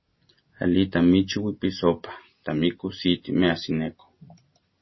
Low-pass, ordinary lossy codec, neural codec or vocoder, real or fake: 7.2 kHz; MP3, 24 kbps; none; real